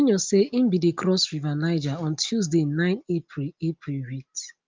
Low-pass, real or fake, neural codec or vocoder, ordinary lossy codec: 7.2 kHz; real; none; Opus, 24 kbps